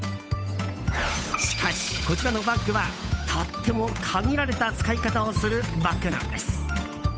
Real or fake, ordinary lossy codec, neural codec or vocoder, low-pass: fake; none; codec, 16 kHz, 8 kbps, FunCodec, trained on Chinese and English, 25 frames a second; none